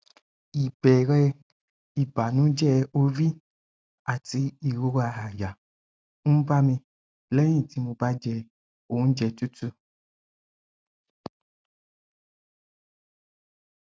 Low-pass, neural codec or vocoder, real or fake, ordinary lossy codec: none; none; real; none